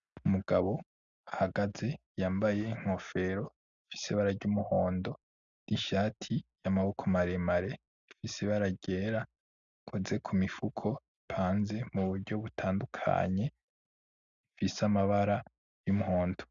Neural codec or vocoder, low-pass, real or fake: none; 7.2 kHz; real